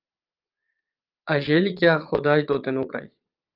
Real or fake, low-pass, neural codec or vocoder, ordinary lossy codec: fake; 5.4 kHz; vocoder, 22.05 kHz, 80 mel bands, Vocos; Opus, 32 kbps